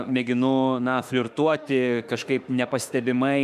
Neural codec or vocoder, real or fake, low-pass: autoencoder, 48 kHz, 32 numbers a frame, DAC-VAE, trained on Japanese speech; fake; 14.4 kHz